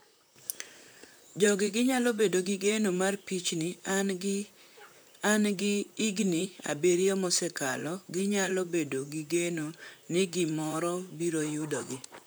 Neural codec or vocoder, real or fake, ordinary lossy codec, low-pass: vocoder, 44.1 kHz, 128 mel bands, Pupu-Vocoder; fake; none; none